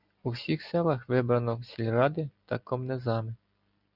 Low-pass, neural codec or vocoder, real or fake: 5.4 kHz; none; real